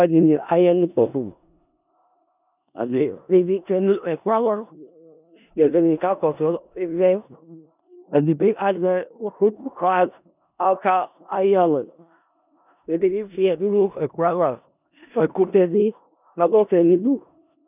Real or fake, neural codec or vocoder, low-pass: fake; codec, 16 kHz in and 24 kHz out, 0.4 kbps, LongCat-Audio-Codec, four codebook decoder; 3.6 kHz